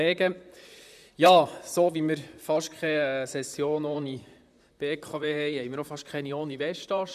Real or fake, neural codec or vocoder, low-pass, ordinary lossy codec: fake; vocoder, 44.1 kHz, 128 mel bands, Pupu-Vocoder; 14.4 kHz; none